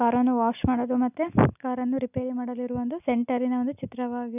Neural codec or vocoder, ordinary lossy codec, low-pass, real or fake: none; none; 3.6 kHz; real